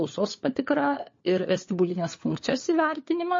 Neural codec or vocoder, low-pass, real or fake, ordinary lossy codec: codec, 16 kHz, 4 kbps, FunCodec, trained on LibriTTS, 50 frames a second; 7.2 kHz; fake; MP3, 32 kbps